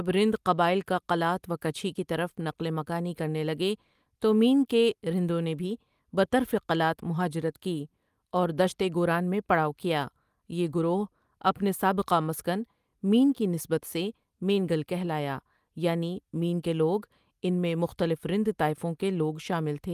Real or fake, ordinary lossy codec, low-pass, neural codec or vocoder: real; Opus, 32 kbps; 14.4 kHz; none